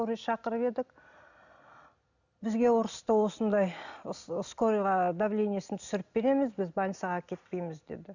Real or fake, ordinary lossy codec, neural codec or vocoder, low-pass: real; none; none; 7.2 kHz